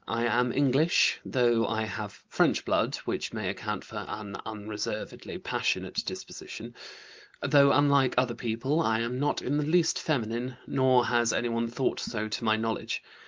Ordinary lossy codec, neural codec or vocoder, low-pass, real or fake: Opus, 32 kbps; none; 7.2 kHz; real